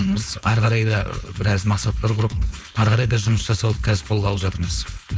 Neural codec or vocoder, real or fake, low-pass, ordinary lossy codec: codec, 16 kHz, 4.8 kbps, FACodec; fake; none; none